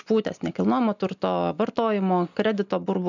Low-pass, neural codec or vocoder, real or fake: 7.2 kHz; none; real